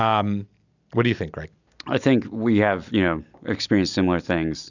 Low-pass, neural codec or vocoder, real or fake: 7.2 kHz; none; real